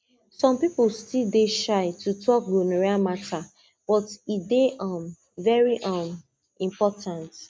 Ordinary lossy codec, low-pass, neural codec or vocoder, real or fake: none; none; none; real